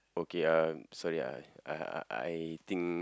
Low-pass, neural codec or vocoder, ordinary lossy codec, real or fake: none; none; none; real